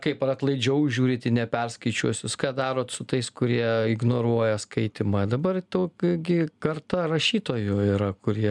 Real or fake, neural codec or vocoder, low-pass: real; none; 10.8 kHz